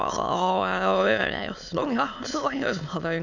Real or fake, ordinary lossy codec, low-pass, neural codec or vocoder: fake; none; 7.2 kHz; autoencoder, 22.05 kHz, a latent of 192 numbers a frame, VITS, trained on many speakers